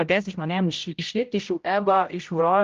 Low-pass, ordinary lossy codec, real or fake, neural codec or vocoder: 7.2 kHz; Opus, 32 kbps; fake; codec, 16 kHz, 0.5 kbps, X-Codec, HuBERT features, trained on general audio